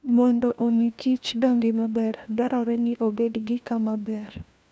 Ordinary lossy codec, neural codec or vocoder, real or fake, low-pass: none; codec, 16 kHz, 1 kbps, FunCodec, trained on LibriTTS, 50 frames a second; fake; none